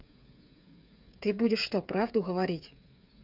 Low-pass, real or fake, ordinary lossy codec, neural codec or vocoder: 5.4 kHz; fake; none; codec, 16 kHz, 8 kbps, FreqCodec, smaller model